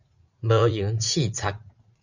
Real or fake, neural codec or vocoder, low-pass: fake; vocoder, 44.1 kHz, 80 mel bands, Vocos; 7.2 kHz